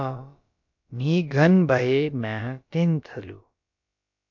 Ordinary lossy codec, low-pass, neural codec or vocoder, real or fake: MP3, 48 kbps; 7.2 kHz; codec, 16 kHz, about 1 kbps, DyCAST, with the encoder's durations; fake